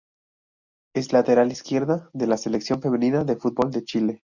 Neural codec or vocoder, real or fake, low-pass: none; real; 7.2 kHz